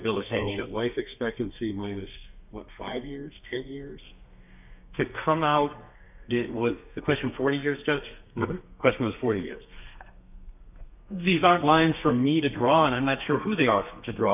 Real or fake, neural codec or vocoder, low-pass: fake; codec, 32 kHz, 1.9 kbps, SNAC; 3.6 kHz